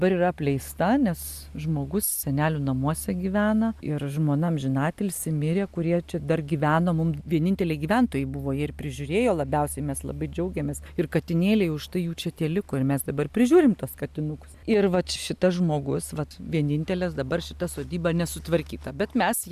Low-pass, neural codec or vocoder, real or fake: 14.4 kHz; none; real